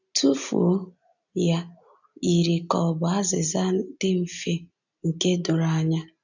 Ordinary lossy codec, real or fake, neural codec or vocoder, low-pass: none; real; none; 7.2 kHz